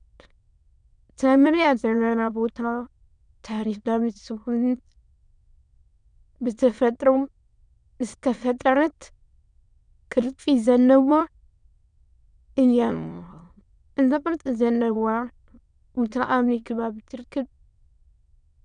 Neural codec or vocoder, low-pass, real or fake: autoencoder, 22.05 kHz, a latent of 192 numbers a frame, VITS, trained on many speakers; 9.9 kHz; fake